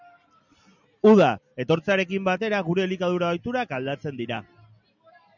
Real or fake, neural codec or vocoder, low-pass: real; none; 7.2 kHz